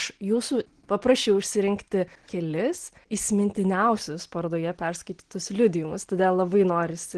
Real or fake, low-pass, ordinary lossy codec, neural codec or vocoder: real; 9.9 kHz; Opus, 16 kbps; none